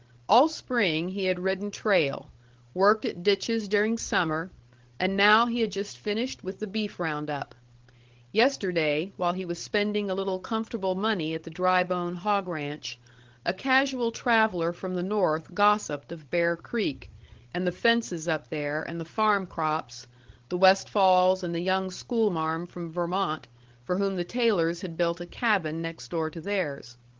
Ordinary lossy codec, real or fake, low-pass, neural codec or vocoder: Opus, 16 kbps; fake; 7.2 kHz; codec, 16 kHz, 16 kbps, FreqCodec, larger model